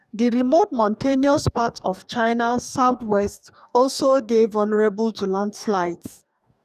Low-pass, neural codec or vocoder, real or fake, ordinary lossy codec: 14.4 kHz; codec, 44.1 kHz, 2.6 kbps, DAC; fake; none